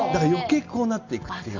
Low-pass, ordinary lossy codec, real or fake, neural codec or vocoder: 7.2 kHz; none; real; none